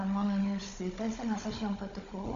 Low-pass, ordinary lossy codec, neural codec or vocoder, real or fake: 7.2 kHz; MP3, 64 kbps; codec, 16 kHz, 16 kbps, FunCodec, trained on Chinese and English, 50 frames a second; fake